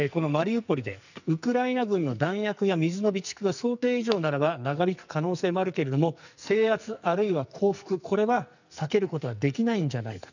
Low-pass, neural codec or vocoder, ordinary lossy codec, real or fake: 7.2 kHz; codec, 44.1 kHz, 2.6 kbps, SNAC; none; fake